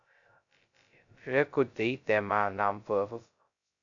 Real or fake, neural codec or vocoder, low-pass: fake; codec, 16 kHz, 0.2 kbps, FocalCodec; 7.2 kHz